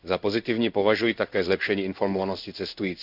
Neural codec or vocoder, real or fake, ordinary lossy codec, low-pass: codec, 16 kHz in and 24 kHz out, 1 kbps, XY-Tokenizer; fake; none; 5.4 kHz